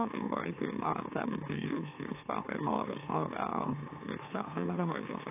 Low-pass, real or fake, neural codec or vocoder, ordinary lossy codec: 3.6 kHz; fake; autoencoder, 44.1 kHz, a latent of 192 numbers a frame, MeloTTS; AAC, 24 kbps